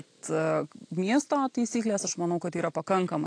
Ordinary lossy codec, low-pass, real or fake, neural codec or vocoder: AAC, 48 kbps; 9.9 kHz; real; none